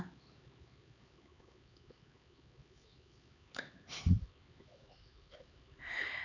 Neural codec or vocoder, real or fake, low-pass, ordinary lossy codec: codec, 16 kHz, 4 kbps, X-Codec, HuBERT features, trained on LibriSpeech; fake; 7.2 kHz; none